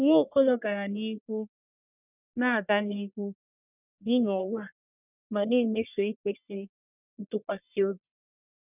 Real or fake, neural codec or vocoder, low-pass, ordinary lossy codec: fake; codec, 44.1 kHz, 1.7 kbps, Pupu-Codec; 3.6 kHz; none